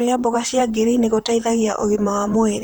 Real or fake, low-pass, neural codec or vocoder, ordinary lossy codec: fake; none; vocoder, 44.1 kHz, 128 mel bands, Pupu-Vocoder; none